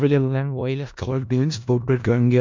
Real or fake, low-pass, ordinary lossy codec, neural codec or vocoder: fake; 7.2 kHz; MP3, 64 kbps; codec, 16 kHz in and 24 kHz out, 0.4 kbps, LongCat-Audio-Codec, four codebook decoder